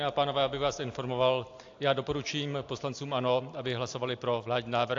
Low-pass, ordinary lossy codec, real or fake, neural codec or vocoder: 7.2 kHz; AAC, 48 kbps; real; none